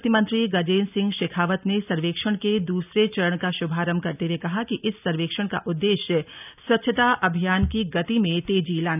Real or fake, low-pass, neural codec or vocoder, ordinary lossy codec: real; 3.6 kHz; none; none